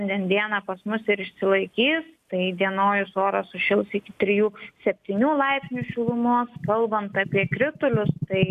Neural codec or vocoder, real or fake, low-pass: none; real; 14.4 kHz